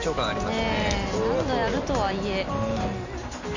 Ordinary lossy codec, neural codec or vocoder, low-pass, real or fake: Opus, 64 kbps; none; 7.2 kHz; real